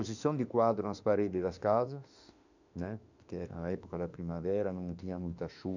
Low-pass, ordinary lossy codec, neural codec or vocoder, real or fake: 7.2 kHz; none; autoencoder, 48 kHz, 32 numbers a frame, DAC-VAE, trained on Japanese speech; fake